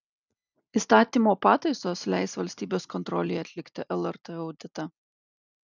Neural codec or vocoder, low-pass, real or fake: none; 7.2 kHz; real